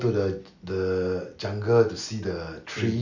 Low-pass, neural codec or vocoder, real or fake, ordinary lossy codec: 7.2 kHz; none; real; none